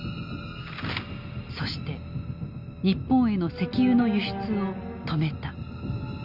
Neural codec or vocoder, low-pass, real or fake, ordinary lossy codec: none; 5.4 kHz; real; none